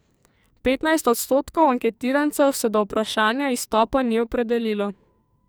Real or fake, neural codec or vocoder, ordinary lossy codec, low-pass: fake; codec, 44.1 kHz, 2.6 kbps, SNAC; none; none